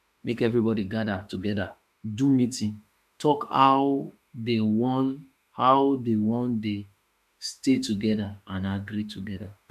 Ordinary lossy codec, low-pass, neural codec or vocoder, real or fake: none; 14.4 kHz; autoencoder, 48 kHz, 32 numbers a frame, DAC-VAE, trained on Japanese speech; fake